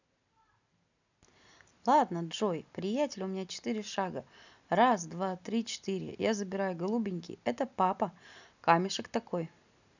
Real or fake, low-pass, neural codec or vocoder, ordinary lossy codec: real; 7.2 kHz; none; none